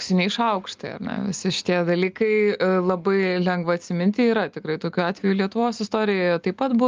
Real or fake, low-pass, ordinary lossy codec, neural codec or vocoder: real; 7.2 kHz; Opus, 24 kbps; none